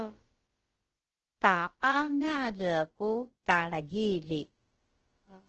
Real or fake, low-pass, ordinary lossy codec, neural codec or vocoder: fake; 7.2 kHz; Opus, 16 kbps; codec, 16 kHz, about 1 kbps, DyCAST, with the encoder's durations